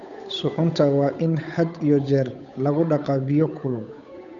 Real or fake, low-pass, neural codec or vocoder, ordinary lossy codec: fake; 7.2 kHz; codec, 16 kHz, 8 kbps, FunCodec, trained on Chinese and English, 25 frames a second; none